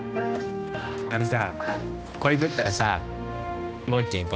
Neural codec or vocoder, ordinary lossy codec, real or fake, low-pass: codec, 16 kHz, 1 kbps, X-Codec, HuBERT features, trained on balanced general audio; none; fake; none